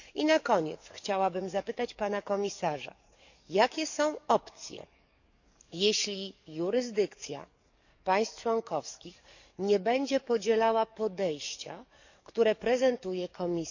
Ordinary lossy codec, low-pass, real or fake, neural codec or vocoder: none; 7.2 kHz; fake; codec, 44.1 kHz, 7.8 kbps, DAC